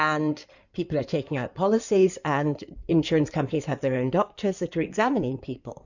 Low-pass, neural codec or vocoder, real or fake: 7.2 kHz; codec, 16 kHz in and 24 kHz out, 2.2 kbps, FireRedTTS-2 codec; fake